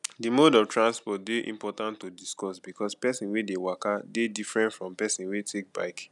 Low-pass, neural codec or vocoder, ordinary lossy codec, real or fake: 10.8 kHz; none; none; real